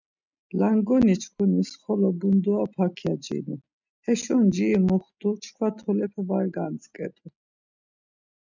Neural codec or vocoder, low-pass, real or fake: none; 7.2 kHz; real